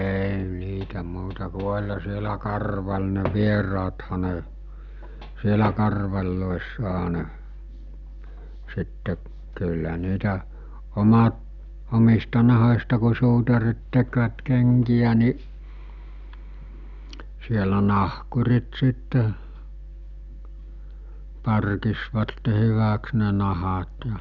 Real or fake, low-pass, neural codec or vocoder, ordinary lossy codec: real; 7.2 kHz; none; none